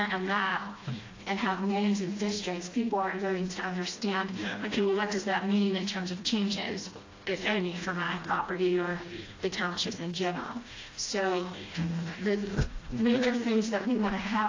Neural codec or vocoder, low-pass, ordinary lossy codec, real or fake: codec, 16 kHz, 1 kbps, FreqCodec, smaller model; 7.2 kHz; AAC, 32 kbps; fake